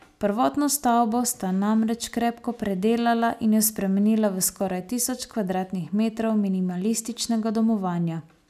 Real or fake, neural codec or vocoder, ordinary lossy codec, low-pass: real; none; none; 14.4 kHz